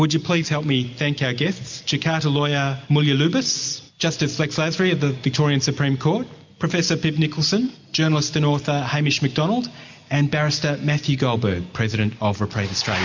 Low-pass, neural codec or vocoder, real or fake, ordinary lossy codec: 7.2 kHz; none; real; MP3, 48 kbps